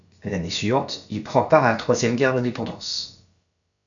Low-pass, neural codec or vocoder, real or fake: 7.2 kHz; codec, 16 kHz, about 1 kbps, DyCAST, with the encoder's durations; fake